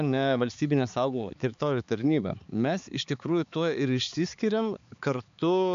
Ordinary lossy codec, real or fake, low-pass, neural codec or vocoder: MP3, 64 kbps; fake; 7.2 kHz; codec, 16 kHz, 4 kbps, X-Codec, HuBERT features, trained on balanced general audio